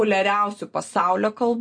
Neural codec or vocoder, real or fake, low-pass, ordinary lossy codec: none; real; 9.9 kHz; MP3, 48 kbps